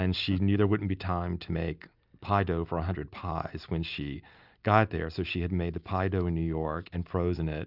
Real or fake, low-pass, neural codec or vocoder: real; 5.4 kHz; none